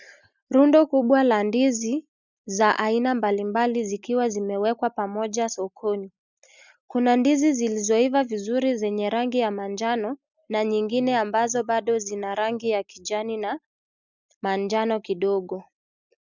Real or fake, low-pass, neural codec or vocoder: real; 7.2 kHz; none